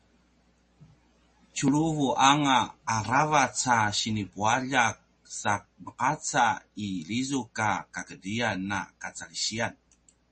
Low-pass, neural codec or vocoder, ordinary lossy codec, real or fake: 10.8 kHz; none; MP3, 32 kbps; real